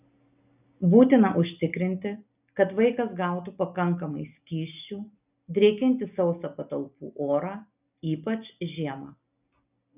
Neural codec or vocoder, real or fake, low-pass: none; real; 3.6 kHz